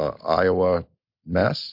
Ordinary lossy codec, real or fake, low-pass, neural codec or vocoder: MP3, 48 kbps; real; 5.4 kHz; none